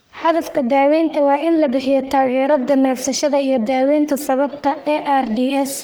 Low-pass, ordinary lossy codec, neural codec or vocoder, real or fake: none; none; codec, 44.1 kHz, 1.7 kbps, Pupu-Codec; fake